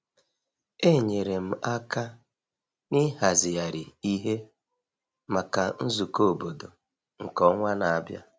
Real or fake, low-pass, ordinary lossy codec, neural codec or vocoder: real; none; none; none